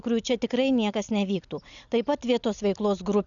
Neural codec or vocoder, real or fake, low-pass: none; real; 7.2 kHz